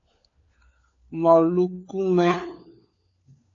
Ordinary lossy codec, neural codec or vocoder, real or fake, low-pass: AAC, 48 kbps; codec, 16 kHz, 4 kbps, FunCodec, trained on LibriTTS, 50 frames a second; fake; 7.2 kHz